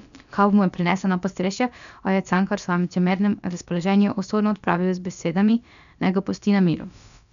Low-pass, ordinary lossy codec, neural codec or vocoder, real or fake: 7.2 kHz; none; codec, 16 kHz, about 1 kbps, DyCAST, with the encoder's durations; fake